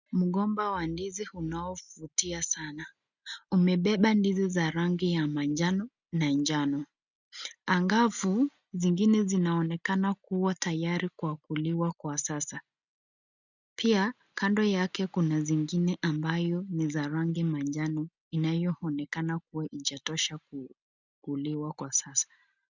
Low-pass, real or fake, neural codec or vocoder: 7.2 kHz; real; none